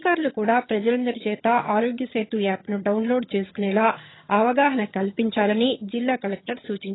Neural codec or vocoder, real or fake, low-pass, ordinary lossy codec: vocoder, 22.05 kHz, 80 mel bands, HiFi-GAN; fake; 7.2 kHz; AAC, 16 kbps